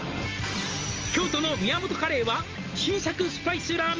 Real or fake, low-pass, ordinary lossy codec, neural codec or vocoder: real; 7.2 kHz; Opus, 24 kbps; none